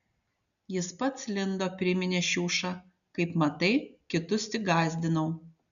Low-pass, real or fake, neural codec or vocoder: 7.2 kHz; real; none